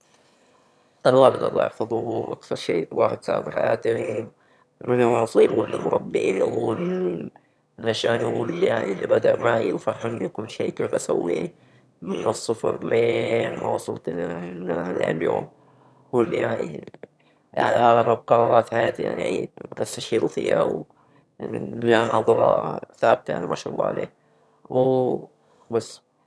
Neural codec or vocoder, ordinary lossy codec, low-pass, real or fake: autoencoder, 22.05 kHz, a latent of 192 numbers a frame, VITS, trained on one speaker; none; none; fake